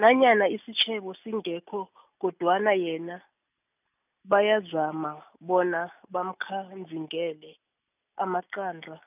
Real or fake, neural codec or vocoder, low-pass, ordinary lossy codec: real; none; 3.6 kHz; none